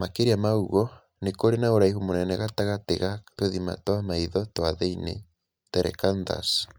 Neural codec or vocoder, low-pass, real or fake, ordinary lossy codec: none; none; real; none